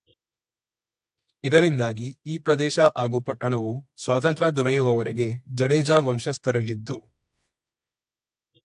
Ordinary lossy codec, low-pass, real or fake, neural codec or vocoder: AAC, 64 kbps; 10.8 kHz; fake; codec, 24 kHz, 0.9 kbps, WavTokenizer, medium music audio release